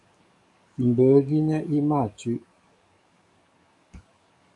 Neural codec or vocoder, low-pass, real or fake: codec, 44.1 kHz, 7.8 kbps, DAC; 10.8 kHz; fake